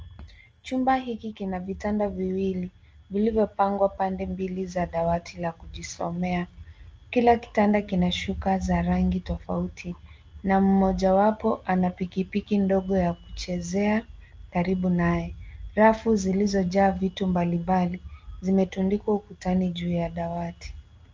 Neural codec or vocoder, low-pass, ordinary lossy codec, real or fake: none; 7.2 kHz; Opus, 24 kbps; real